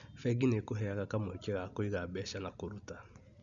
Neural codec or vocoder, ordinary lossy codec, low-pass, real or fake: none; none; 7.2 kHz; real